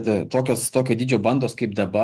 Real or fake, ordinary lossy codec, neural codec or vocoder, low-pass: fake; Opus, 24 kbps; autoencoder, 48 kHz, 128 numbers a frame, DAC-VAE, trained on Japanese speech; 14.4 kHz